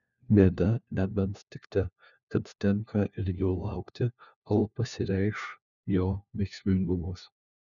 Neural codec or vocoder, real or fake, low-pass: codec, 16 kHz, 1 kbps, FunCodec, trained on LibriTTS, 50 frames a second; fake; 7.2 kHz